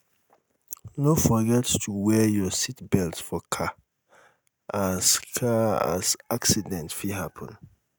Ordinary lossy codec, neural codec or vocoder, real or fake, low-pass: none; none; real; none